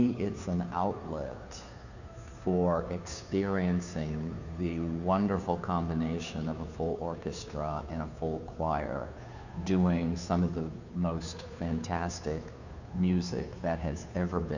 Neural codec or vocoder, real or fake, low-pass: codec, 16 kHz, 2 kbps, FunCodec, trained on Chinese and English, 25 frames a second; fake; 7.2 kHz